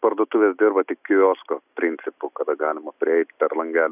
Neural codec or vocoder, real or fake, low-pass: none; real; 3.6 kHz